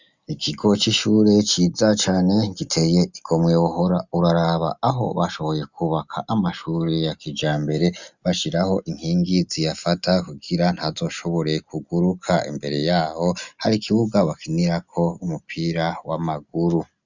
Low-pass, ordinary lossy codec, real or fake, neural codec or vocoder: 7.2 kHz; Opus, 64 kbps; real; none